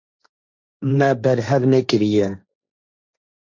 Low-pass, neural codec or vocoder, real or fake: 7.2 kHz; codec, 16 kHz, 1.1 kbps, Voila-Tokenizer; fake